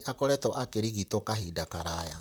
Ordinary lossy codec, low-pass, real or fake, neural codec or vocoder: none; none; fake; vocoder, 44.1 kHz, 128 mel bands, Pupu-Vocoder